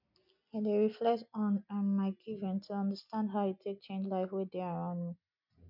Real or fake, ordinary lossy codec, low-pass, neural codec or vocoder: real; none; 5.4 kHz; none